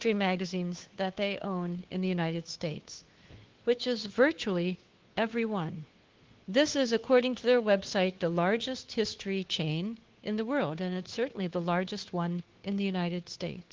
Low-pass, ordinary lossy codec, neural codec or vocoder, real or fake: 7.2 kHz; Opus, 16 kbps; codec, 16 kHz, 2 kbps, FunCodec, trained on Chinese and English, 25 frames a second; fake